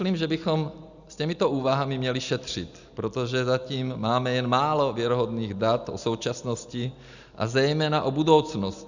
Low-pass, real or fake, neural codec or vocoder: 7.2 kHz; real; none